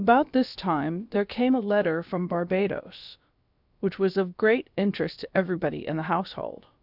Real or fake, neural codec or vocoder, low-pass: fake; codec, 16 kHz, about 1 kbps, DyCAST, with the encoder's durations; 5.4 kHz